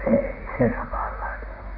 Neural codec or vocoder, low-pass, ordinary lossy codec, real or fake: vocoder, 44.1 kHz, 128 mel bands, Pupu-Vocoder; 5.4 kHz; AAC, 48 kbps; fake